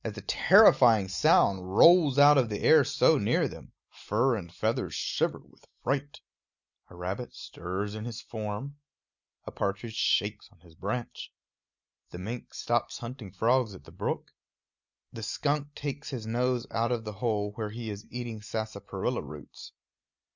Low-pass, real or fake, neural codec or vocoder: 7.2 kHz; real; none